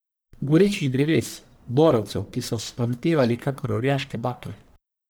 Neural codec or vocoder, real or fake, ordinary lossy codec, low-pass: codec, 44.1 kHz, 1.7 kbps, Pupu-Codec; fake; none; none